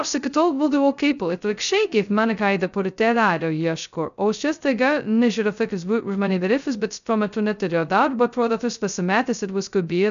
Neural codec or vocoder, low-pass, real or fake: codec, 16 kHz, 0.2 kbps, FocalCodec; 7.2 kHz; fake